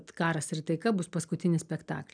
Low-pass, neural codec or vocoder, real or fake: 9.9 kHz; none; real